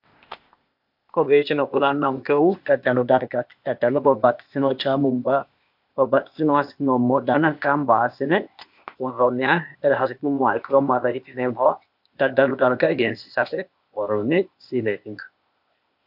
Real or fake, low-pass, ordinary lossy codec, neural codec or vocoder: fake; 5.4 kHz; MP3, 48 kbps; codec, 16 kHz, 0.8 kbps, ZipCodec